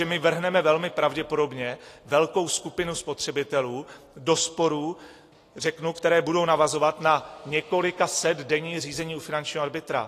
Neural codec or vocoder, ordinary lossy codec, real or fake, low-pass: none; AAC, 48 kbps; real; 14.4 kHz